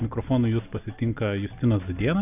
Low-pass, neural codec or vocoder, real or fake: 3.6 kHz; none; real